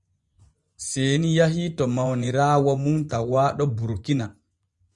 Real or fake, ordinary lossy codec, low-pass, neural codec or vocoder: fake; Opus, 64 kbps; 10.8 kHz; vocoder, 24 kHz, 100 mel bands, Vocos